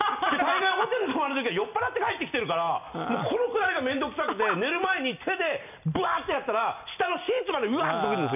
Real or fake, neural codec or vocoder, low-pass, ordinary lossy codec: real; none; 3.6 kHz; none